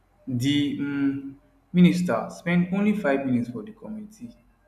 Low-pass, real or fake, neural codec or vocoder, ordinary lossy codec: 14.4 kHz; real; none; none